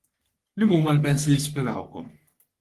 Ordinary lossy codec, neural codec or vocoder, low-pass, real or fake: Opus, 24 kbps; codec, 44.1 kHz, 3.4 kbps, Pupu-Codec; 14.4 kHz; fake